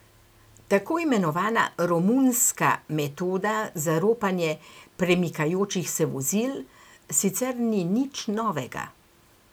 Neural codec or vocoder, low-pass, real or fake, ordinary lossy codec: none; none; real; none